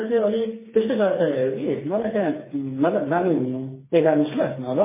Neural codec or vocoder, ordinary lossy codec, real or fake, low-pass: codec, 16 kHz, 4 kbps, FreqCodec, smaller model; MP3, 16 kbps; fake; 3.6 kHz